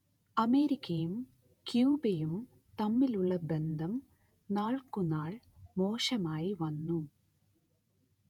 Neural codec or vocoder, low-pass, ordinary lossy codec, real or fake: vocoder, 48 kHz, 128 mel bands, Vocos; 19.8 kHz; none; fake